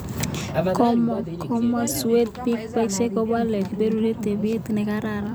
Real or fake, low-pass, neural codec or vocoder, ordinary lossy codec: fake; none; vocoder, 44.1 kHz, 128 mel bands every 256 samples, BigVGAN v2; none